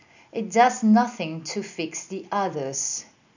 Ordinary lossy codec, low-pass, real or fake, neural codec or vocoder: none; 7.2 kHz; real; none